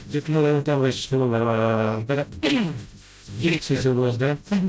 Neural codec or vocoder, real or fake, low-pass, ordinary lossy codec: codec, 16 kHz, 0.5 kbps, FreqCodec, smaller model; fake; none; none